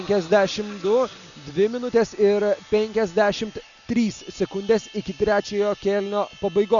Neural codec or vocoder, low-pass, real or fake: none; 7.2 kHz; real